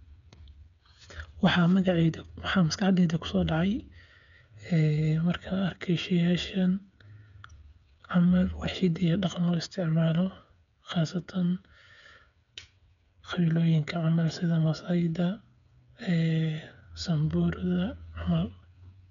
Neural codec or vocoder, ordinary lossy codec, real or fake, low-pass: codec, 16 kHz, 8 kbps, FreqCodec, smaller model; none; fake; 7.2 kHz